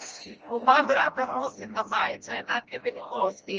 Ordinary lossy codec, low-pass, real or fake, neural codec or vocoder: Opus, 24 kbps; 7.2 kHz; fake; codec, 16 kHz, 1 kbps, FreqCodec, smaller model